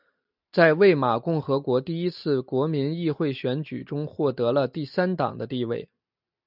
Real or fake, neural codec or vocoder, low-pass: real; none; 5.4 kHz